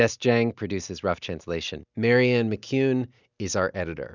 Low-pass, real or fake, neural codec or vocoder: 7.2 kHz; real; none